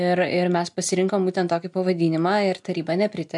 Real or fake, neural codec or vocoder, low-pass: real; none; 10.8 kHz